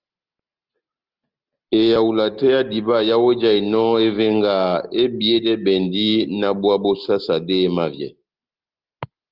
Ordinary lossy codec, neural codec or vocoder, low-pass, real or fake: Opus, 24 kbps; none; 5.4 kHz; real